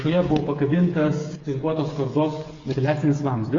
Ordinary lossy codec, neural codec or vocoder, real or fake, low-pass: AAC, 32 kbps; codec, 16 kHz, 16 kbps, FreqCodec, smaller model; fake; 7.2 kHz